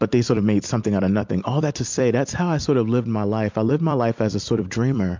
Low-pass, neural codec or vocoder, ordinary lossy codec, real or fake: 7.2 kHz; vocoder, 44.1 kHz, 128 mel bands every 256 samples, BigVGAN v2; MP3, 64 kbps; fake